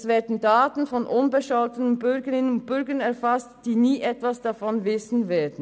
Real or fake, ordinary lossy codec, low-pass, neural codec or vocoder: real; none; none; none